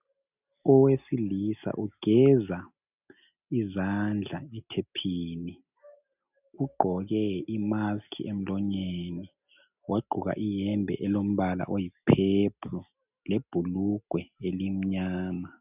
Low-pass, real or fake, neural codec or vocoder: 3.6 kHz; real; none